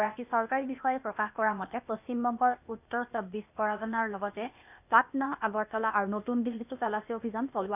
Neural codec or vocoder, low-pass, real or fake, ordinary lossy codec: codec, 16 kHz, 0.8 kbps, ZipCodec; 3.6 kHz; fake; none